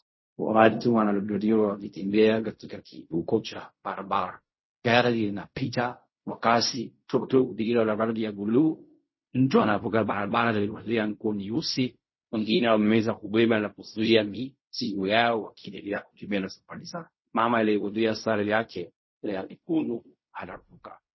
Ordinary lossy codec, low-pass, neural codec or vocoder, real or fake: MP3, 24 kbps; 7.2 kHz; codec, 16 kHz in and 24 kHz out, 0.4 kbps, LongCat-Audio-Codec, fine tuned four codebook decoder; fake